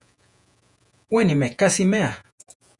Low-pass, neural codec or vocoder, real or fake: 10.8 kHz; vocoder, 48 kHz, 128 mel bands, Vocos; fake